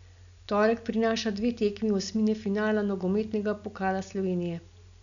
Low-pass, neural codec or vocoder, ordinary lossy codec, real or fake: 7.2 kHz; none; none; real